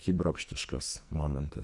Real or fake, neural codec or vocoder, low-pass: fake; codec, 44.1 kHz, 2.6 kbps, SNAC; 10.8 kHz